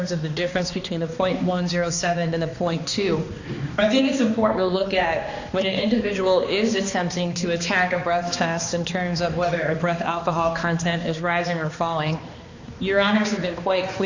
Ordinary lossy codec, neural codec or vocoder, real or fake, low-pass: Opus, 64 kbps; codec, 16 kHz, 2 kbps, X-Codec, HuBERT features, trained on balanced general audio; fake; 7.2 kHz